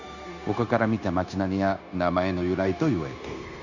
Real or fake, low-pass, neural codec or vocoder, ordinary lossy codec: fake; 7.2 kHz; codec, 16 kHz, 0.9 kbps, LongCat-Audio-Codec; none